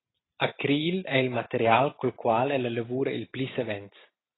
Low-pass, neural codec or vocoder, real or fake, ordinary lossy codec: 7.2 kHz; none; real; AAC, 16 kbps